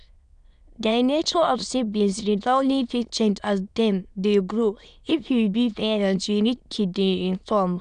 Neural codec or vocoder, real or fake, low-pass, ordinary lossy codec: autoencoder, 22.05 kHz, a latent of 192 numbers a frame, VITS, trained on many speakers; fake; 9.9 kHz; none